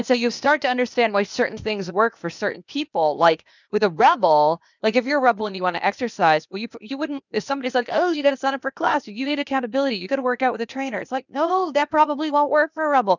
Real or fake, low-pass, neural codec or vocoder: fake; 7.2 kHz; codec, 16 kHz, 0.8 kbps, ZipCodec